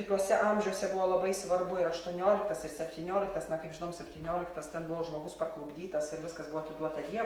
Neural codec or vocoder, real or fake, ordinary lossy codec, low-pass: autoencoder, 48 kHz, 128 numbers a frame, DAC-VAE, trained on Japanese speech; fake; Opus, 24 kbps; 19.8 kHz